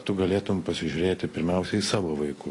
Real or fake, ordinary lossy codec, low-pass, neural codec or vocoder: real; AAC, 32 kbps; 10.8 kHz; none